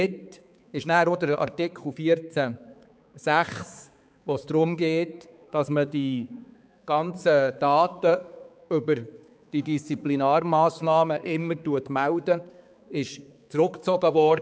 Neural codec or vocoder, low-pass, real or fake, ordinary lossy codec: codec, 16 kHz, 4 kbps, X-Codec, HuBERT features, trained on balanced general audio; none; fake; none